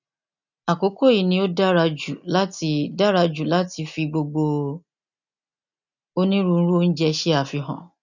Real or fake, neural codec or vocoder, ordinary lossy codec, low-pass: real; none; none; 7.2 kHz